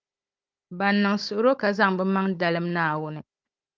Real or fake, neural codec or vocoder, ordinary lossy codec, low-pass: fake; codec, 16 kHz, 16 kbps, FunCodec, trained on Chinese and English, 50 frames a second; Opus, 32 kbps; 7.2 kHz